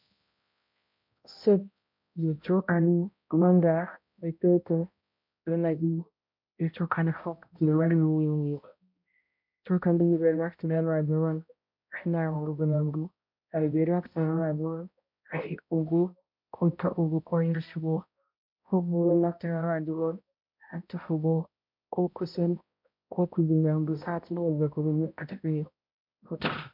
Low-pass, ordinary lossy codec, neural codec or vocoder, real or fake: 5.4 kHz; MP3, 48 kbps; codec, 16 kHz, 0.5 kbps, X-Codec, HuBERT features, trained on balanced general audio; fake